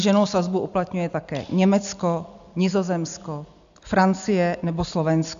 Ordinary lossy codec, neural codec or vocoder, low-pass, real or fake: AAC, 96 kbps; none; 7.2 kHz; real